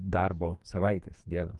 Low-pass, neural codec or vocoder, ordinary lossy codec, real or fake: 10.8 kHz; codec, 44.1 kHz, 2.6 kbps, SNAC; Opus, 24 kbps; fake